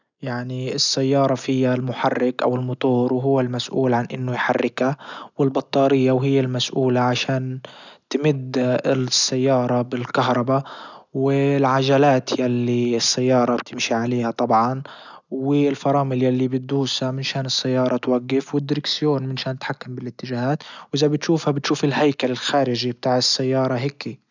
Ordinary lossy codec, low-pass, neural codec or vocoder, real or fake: none; 7.2 kHz; none; real